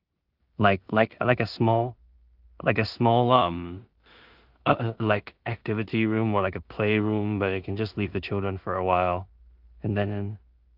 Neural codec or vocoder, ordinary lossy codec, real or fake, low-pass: codec, 16 kHz in and 24 kHz out, 0.4 kbps, LongCat-Audio-Codec, two codebook decoder; Opus, 32 kbps; fake; 5.4 kHz